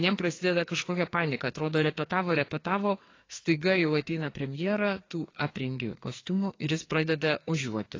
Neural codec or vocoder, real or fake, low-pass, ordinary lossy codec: codec, 44.1 kHz, 2.6 kbps, SNAC; fake; 7.2 kHz; AAC, 32 kbps